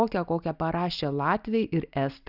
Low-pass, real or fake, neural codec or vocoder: 5.4 kHz; real; none